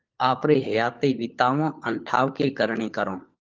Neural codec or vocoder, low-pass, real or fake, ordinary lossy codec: codec, 16 kHz, 4 kbps, FunCodec, trained on LibriTTS, 50 frames a second; 7.2 kHz; fake; Opus, 24 kbps